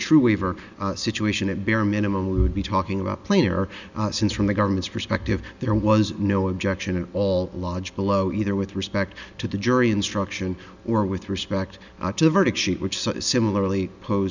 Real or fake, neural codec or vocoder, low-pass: real; none; 7.2 kHz